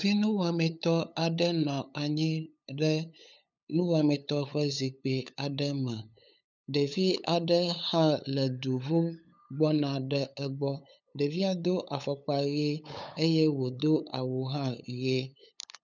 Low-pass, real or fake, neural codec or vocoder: 7.2 kHz; fake; codec, 16 kHz, 8 kbps, FunCodec, trained on LibriTTS, 25 frames a second